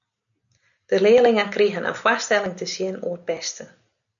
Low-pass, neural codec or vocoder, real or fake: 7.2 kHz; none; real